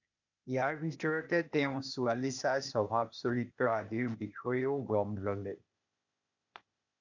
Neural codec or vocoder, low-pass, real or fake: codec, 16 kHz, 0.8 kbps, ZipCodec; 7.2 kHz; fake